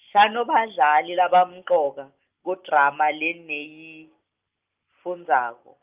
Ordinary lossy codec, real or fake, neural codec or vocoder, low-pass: Opus, 24 kbps; real; none; 3.6 kHz